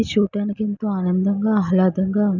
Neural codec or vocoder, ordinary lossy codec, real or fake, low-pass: none; none; real; 7.2 kHz